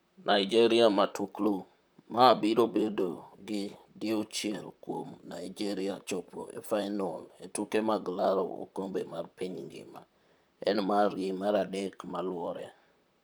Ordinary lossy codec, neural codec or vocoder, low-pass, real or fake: none; vocoder, 44.1 kHz, 128 mel bands, Pupu-Vocoder; none; fake